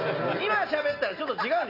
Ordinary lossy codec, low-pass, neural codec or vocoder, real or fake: none; 5.4 kHz; none; real